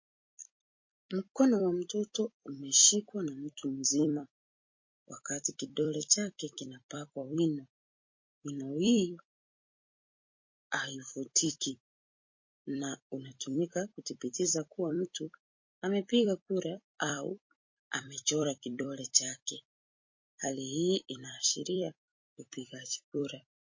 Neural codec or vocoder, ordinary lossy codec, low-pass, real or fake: vocoder, 44.1 kHz, 128 mel bands every 256 samples, BigVGAN v2; MP3, 32 kbps; 7.2 kHz; fake